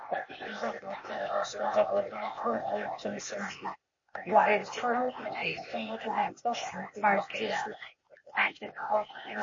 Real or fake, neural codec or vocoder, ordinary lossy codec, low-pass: fake; codec, 16 kHz, 0.8 kbps, ZipCodec; MP3, 32 kbps; 7.2 kHz